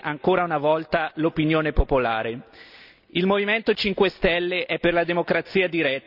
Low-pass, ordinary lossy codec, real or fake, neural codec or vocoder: 5.4 kHz; none; real; none